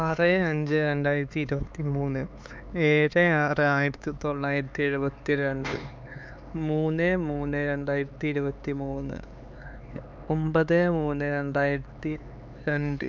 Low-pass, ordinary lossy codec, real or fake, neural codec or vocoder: none; none; fake; codec, 16 kHz, 4 kbps, X-Codec, HuBERT features, trained on LibriSpeech